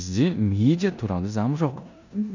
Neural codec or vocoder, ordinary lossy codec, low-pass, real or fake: codec, 16 kHz in and 24 kHz out, 0.9 kbps, LongCat-Audio-Codec, four codebook decoder; none; 7.2 kHz; fake